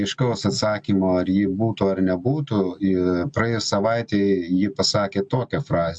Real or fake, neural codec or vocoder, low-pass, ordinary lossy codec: real; none; 9.9 kHz; MP3, 64 kbps